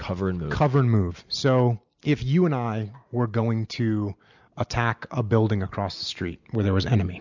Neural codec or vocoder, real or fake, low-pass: none; real; 7.2 kHz